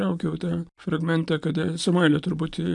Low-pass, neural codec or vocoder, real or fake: 10.8 kHz; none; real